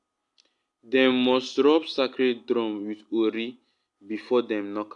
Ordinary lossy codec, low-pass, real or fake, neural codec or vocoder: AAC, 64 kbps; 10.8 kHz; real; none